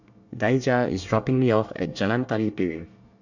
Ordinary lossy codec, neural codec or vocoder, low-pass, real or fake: none; codec, 24 kHz, 1 kbps, SNAC; 7.2 kHz; fake